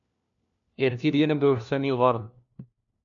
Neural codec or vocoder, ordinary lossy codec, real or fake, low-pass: codec, 16 kHz, 1 kbps, FunCodec, trained on LibriTTS, 50 frames a second; AAC, 64 kbps; fake; 7.2 kHz